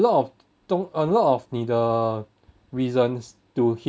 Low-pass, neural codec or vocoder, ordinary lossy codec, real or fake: none; none; none; real